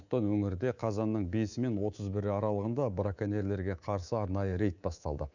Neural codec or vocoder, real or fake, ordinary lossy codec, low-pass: codec, 24 kHz, 3.1 kbps, DualCodec; fake; none; 7.2 kHz